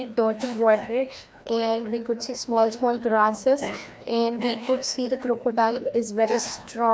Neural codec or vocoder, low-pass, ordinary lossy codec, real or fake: codec, 16 kHz, 1 kbps, FreqCodec, larger model; none; none; fake